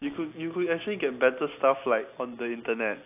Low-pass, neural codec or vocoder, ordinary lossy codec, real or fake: 3.6 kHz; none; AAC, 32 kbps; real